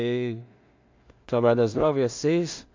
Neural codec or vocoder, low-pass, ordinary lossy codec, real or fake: codec, 16 kHz in and 24 kHz out, 0.4 kbps, LongCat-Audio-Codec, two codebook decoder; 7.2 kHz; MP3, 64 kbps; fake